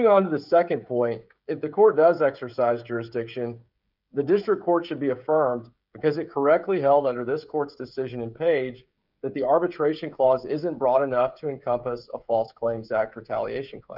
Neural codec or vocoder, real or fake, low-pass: codec, 16 kHz, 16 kbps, FreqCodec, smaller model; fake; 5.4 kHz